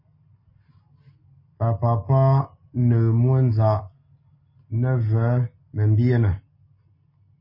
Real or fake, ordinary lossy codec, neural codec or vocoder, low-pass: real; MP3, 24 kbps; none; 5.4 kHz